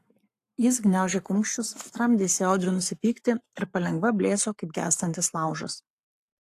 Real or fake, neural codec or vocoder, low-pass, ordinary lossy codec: fake; codec, 44.1 kHz, 7.8 kbps, Pupu-Codec; 14.4 kHz; AAC, 64 kbps